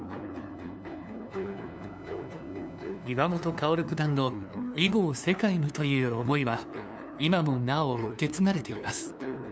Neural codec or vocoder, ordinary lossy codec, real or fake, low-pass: codec, 16 kHz, 2 kbps, FunCodec, trained on LibriTTS, 25 frames a second; none; fake; none